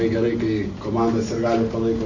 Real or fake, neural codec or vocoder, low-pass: real; none; 7.2 kHz